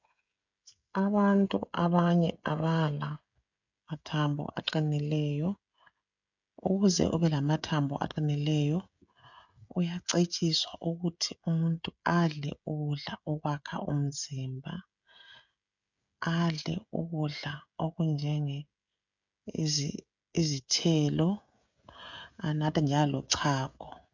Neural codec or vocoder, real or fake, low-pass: codec, 16 kHz, 16 kbps, FreqCodec, smaller model; fake; 7.2 kHz